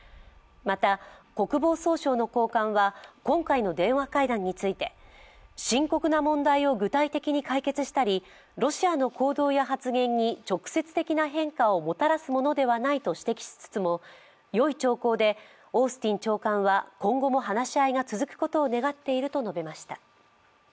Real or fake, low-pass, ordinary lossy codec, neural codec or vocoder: real; none; none; none